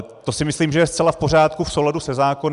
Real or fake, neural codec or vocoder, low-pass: real; none; 10.8 kHz